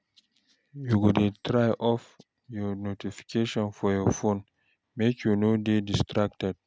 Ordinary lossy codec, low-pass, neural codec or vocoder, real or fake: none; none; none; real